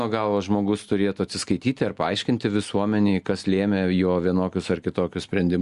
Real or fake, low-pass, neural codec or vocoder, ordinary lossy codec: real; 10.8 kHz; none; Opus, 64 kbps